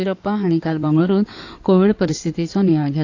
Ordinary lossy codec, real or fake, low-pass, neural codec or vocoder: AAC, 48 kbps; fake; 7.2 kHz; codec, 16 kHz in and 24 kHz out, 2.2 kbps, FireRedTTS-2 codec